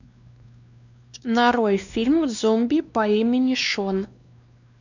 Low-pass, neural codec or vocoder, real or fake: 7.2 kHz; codec, 16 kHz, 2 kbps, X-Codec, WavLM features, trained on Multilingual LibriSpeech; fake